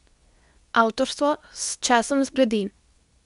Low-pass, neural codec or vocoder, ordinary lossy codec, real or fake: 10.8 kHz; codec, 24 kHz, 0.9 kbps, WavTokenizer, medium speech release version 2; none; fake